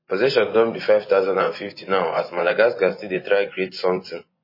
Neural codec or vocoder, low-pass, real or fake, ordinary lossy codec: vocoder, 24 kHz, 100 mel bands, Vocos; 5.4 kHz; fake; MP3, 24 kbps